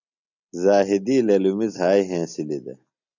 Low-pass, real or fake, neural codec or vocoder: 7.2 kHz; real; none